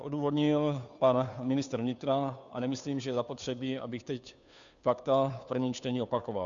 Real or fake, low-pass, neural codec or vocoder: fake; 7.2 kHz; codec, 16 kHz, 2 kbps, FunCodec, trained on Chinese and English, 25 frames a second